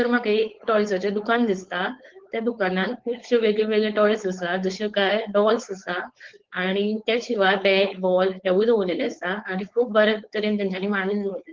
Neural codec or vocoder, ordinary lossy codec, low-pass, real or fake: codec, 16 kHz, 4.8 kbps, FACodec; Opus, 16 kbps; 7.2 kHz; fake